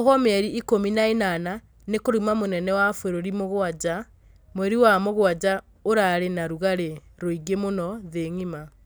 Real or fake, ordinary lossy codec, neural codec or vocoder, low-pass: real; none; none; none